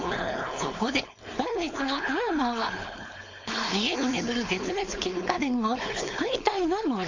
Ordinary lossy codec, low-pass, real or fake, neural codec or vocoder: AAC, 48 kbps; 7.2 kHz; fake; codec, 16 kHz, 4.8 kbps, FACodec